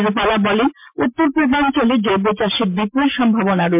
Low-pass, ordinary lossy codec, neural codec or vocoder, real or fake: 3.6 kHz; MP3, 32 kbps; none; real